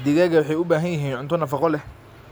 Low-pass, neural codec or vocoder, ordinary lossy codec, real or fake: none; none; none; real